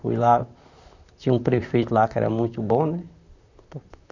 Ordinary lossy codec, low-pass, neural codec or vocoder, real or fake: none; 7.2 kHz; vocoder, 44.1 kHz, 128 mel bands, Pupu-Vocoder; fake